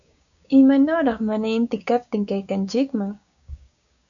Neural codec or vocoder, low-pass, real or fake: codec, 16 kHz, 6 kbps, DAC; 7.2 kHz; fake